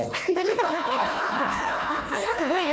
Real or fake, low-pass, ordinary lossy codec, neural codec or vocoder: fake; none; none; codec, 16 kHz, 1 kbps, FunCodec, trained on Chinese and English, 50 frames a second